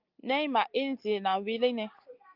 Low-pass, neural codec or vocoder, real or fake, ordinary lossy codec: 5.4 kHz; none; real; Opus, 24 kbps